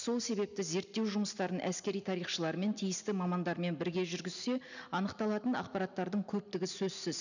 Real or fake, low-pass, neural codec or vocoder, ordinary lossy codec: real; 7.2 kHz; none; none